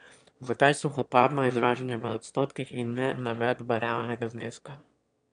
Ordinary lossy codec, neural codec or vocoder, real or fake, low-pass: MP3, 96 kbps; autoencoder, 22.05 kHz, a latent of 192 numbers a frame, VITS, trained on one speaker; fake; 9.9 kHz